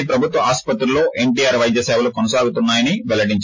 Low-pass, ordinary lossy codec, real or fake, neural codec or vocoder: 7.2 kHz; MP3, 32 kbps; real; none